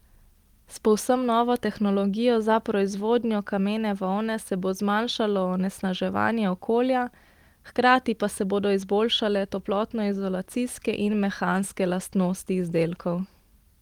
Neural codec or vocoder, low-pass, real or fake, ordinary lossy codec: none; 19.8 kHz; real; Opus, 24 kbps